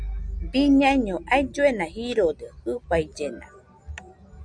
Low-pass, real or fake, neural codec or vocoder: 9.9 kHz; real; none